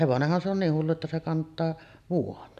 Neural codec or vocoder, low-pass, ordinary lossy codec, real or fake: none; 14.4 kHz; none; real